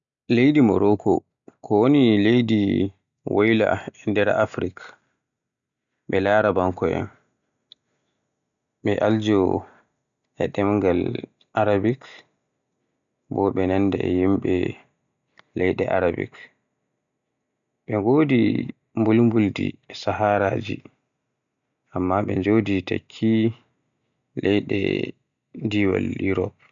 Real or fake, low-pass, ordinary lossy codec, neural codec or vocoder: real; 7.2 kHz; none; none